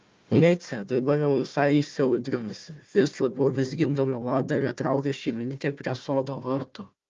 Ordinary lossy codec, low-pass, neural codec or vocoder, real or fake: Opus, 32 kbps; 7.2 kHz; codec, 16 kHz, 1 kbps, FunCodec, trained on Chinese and English, 50 frames a second; fake